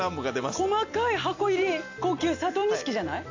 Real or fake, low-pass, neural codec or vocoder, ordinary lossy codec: real; 7.2 kHz; none; AAC, 48 kbps